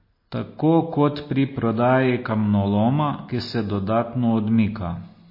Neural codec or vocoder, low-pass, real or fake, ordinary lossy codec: none; 5.4 kHz; real; MP3, 24 kbps